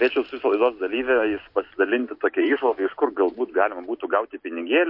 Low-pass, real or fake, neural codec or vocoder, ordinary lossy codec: 5.4 kHz; real; none; MP3, 32 kbps